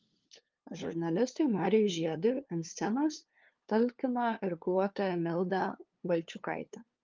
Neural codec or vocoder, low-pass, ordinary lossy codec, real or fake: codec, 16 kHz, 2 kbps, FunCodec, trained on LibriTTS, 25 frames a second; 7.2 kHz; Opus, 24 kbps; fake